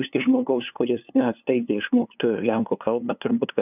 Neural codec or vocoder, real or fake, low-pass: codec, 16 kHz, 2 kbps, FunCodec, trained on LibriTTS, 25 frames a second; fake; 3.6 kHz